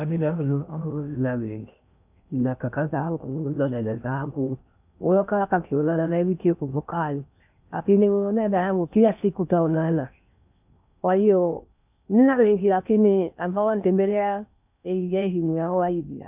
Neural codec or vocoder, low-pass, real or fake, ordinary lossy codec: codec, 16 kHz in and 24 kHz out, 0.6 kbps, FocalCodec, streaming, 2048 codes; 3.6 kHz; fake; none